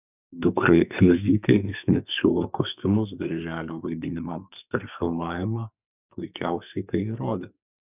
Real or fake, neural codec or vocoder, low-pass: fake; codec, 44.1 kHz, 2.6 kbps, SNAC; 3.6 kHz